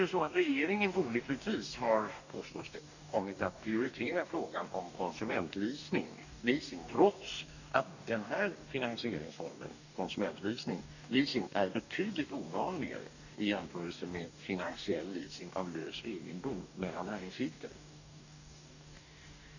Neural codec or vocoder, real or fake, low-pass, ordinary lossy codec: codec, 44.1 kHz, 2.6 kbps, DAC; fake; 7.2 kHz; none